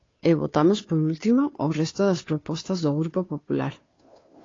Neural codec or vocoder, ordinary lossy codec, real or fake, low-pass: codec, 16 kHz, 2 kbps, FunCodec, trained on Chinese and English, 25 frames a second; AAC, 32 kbps; fake; 7.2 kHz